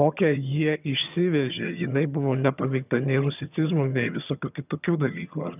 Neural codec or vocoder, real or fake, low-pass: vocoder, 22.05 kHz, 80 mel bands, HiFi-GAN; fake; 3.6 kHz